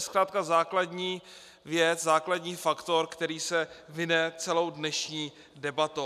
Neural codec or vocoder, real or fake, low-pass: none; real; 14.4 kHz